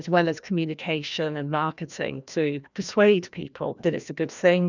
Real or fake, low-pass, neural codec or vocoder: fake; 7.2 kHz; codec, 16 kHz, 1 kbps, FreqCodec, larger model